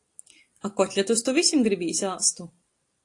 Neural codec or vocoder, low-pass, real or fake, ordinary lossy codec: none; 10.8 kHz; real; AAC, 48 kbps